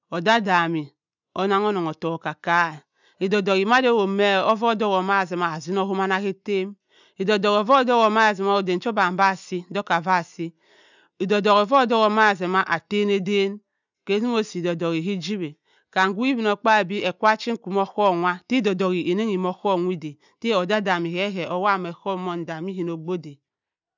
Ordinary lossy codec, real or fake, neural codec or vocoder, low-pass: none; real; none; 7.2 kHz